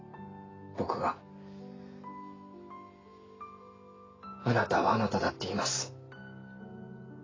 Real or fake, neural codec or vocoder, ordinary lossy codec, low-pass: real; none; AAC, 32 kbps; 7.2 kHz